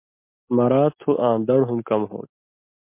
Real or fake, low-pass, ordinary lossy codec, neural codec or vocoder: real; 3.6 kHz; MP3, 24 kbps; none